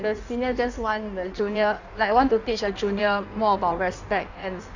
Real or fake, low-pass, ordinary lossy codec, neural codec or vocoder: fake; 7.2 kHz; Opus, 64 kbps; codec, 16 kHz in and 24 kHz out, 1.1 kbps, FireRedTTS-2 codec